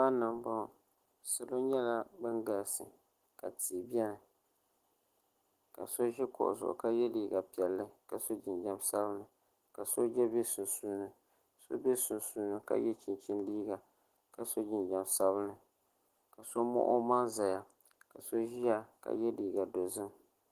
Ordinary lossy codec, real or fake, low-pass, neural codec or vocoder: Opus, 16 kbps; real; 14.4 kHz; none